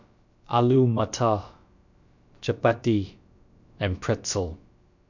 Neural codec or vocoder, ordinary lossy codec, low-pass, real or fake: codec, 16 kHz, about 1 kbps, DyCAST, with the encoder's durations; none; 7.2 kHz; fake